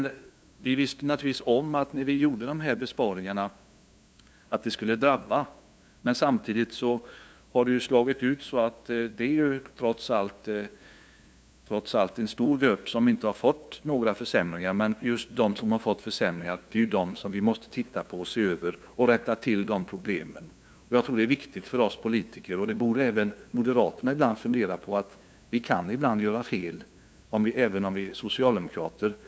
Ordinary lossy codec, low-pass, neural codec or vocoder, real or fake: none; none; codec, 16 kHz, 2 kbps, FunCodec, trained on LibriTTS, 25 frames a second; fake